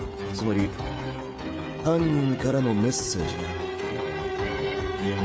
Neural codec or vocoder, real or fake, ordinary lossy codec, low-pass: codec, 16 kHz, 16 kbps, FreqCodec, smaller model; fake; none; none